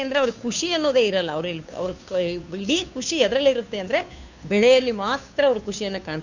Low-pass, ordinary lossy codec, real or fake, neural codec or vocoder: 7.2 kHz; none; fake; codec, 16 kHz, 2 kbps, FunCodec, trained on Chinese and English, 25 frames a second